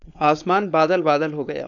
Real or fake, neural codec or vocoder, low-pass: fake; codec, 16 kHz, 4.8 kbps, FACodec; 7.2 kHz